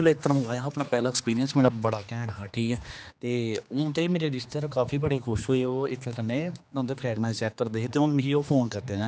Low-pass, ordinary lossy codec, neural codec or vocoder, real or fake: none; none; codec, 16 kHz, 2 kbps, X-Codec, HuBERT features, trained on general audio; fake